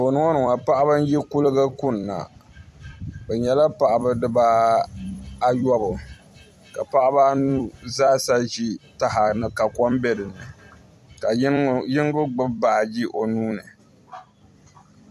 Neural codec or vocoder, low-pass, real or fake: vocoder, 44.1 kHz, 128 mel bands every 256 samples, BigVGAN v2; 14.4 kHz; fake